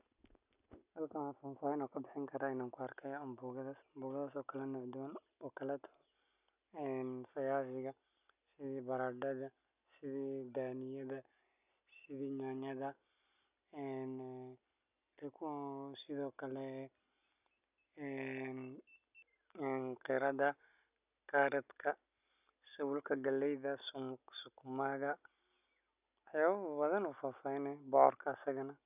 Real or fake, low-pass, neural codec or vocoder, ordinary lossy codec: real; 3.6 kHz; none; none